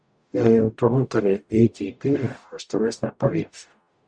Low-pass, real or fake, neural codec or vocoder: 9.9 kHz; fake; codec, 44.1 kHz, 0.9 kbps, DAC